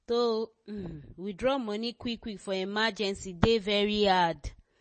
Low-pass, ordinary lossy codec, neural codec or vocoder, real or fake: 9.9 kHz; MP3, 32 kbps; none; real